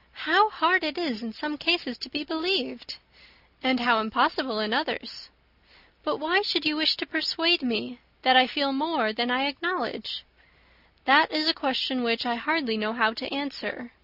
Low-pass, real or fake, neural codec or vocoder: 5.4 kHz; real; none